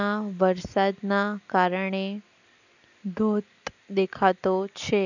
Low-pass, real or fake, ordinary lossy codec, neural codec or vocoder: 7.2 kHz; real; none; none